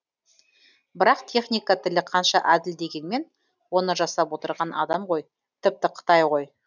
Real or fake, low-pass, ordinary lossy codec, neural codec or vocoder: real; 7.2 kHz; none; none